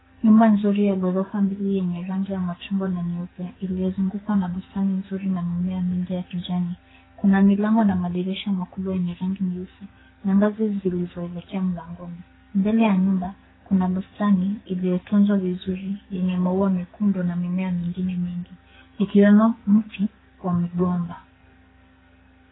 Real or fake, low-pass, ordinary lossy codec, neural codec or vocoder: fake; 7.2 kHz; AAC, 16 kbps; codec, 44.1 kHz, 2.6 kbps, SNAC